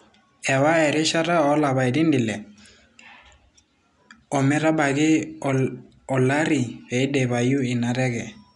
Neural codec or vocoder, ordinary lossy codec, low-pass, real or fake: none; MP3, 96 kbps; 10.8 kHz; real